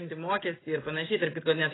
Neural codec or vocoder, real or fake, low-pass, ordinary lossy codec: none; real; 7.2 kHz; AAC, 16 kbps